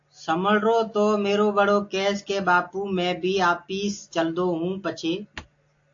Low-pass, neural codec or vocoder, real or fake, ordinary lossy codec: 7.2 kHz; none; real; AAC, 48 kbps